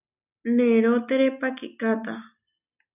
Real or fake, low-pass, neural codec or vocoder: real; 3.6 kHz; none